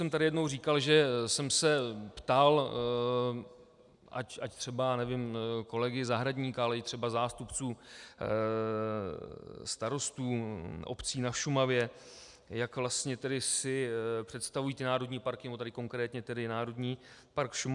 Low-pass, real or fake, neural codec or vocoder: 10.8 kHz; real; none